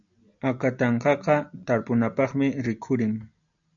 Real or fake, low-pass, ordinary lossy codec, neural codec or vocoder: real; 7.2 kHz; MP3, 96 kbps; none